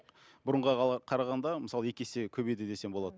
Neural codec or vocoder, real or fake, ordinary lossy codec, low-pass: none; real; none; none